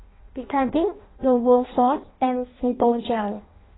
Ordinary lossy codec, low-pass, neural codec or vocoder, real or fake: AAC, 16 kbps; 7.2 kHz; codec, 16 kHz in and 24 kHz out, 0.6 kbps, FireRedTTS-2 codec; fake